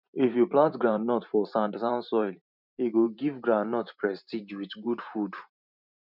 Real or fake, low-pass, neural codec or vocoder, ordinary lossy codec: fake; 5.4 kHz; vocoder, 44.1 kHz, 128 mel bands every 512 samples, BigVGAN v2; none